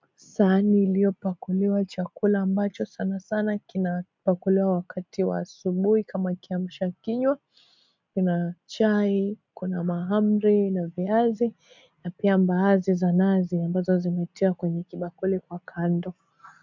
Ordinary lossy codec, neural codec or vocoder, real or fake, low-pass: MP3, 64 kbps; none; real; 7.2 kHz